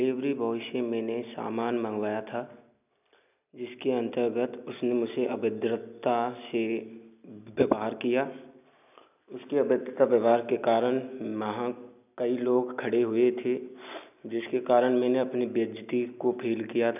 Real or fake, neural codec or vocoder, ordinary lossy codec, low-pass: real; none; none; 3.6 kHz